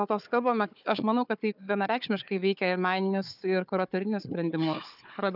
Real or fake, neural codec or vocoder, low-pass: fake; codec, 16 kHz, 4 kbps, FunCodec, trained on Chinese and English, 50 frames a second; 5.4 kHz